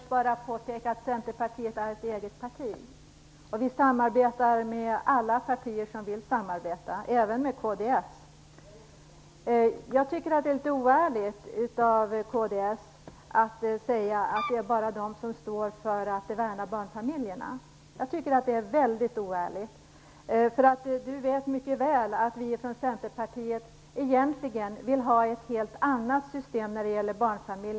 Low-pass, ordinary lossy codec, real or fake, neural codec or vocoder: none; none; real; none